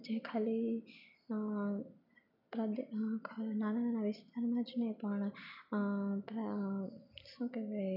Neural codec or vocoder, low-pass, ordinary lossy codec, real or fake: none; 5.4 kHz; none; real